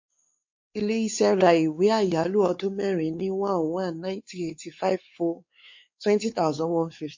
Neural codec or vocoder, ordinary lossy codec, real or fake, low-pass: codec, 16 kHz, 4 kbps, X-Codec, WavLM features, trained on Multilingual LibriSpeech; MP3, 48 kbps; fake; 7.2 kHz